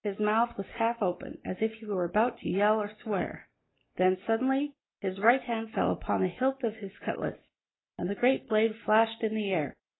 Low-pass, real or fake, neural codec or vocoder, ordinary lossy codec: 7.2 kHz; real; none; AAC, 16 kbps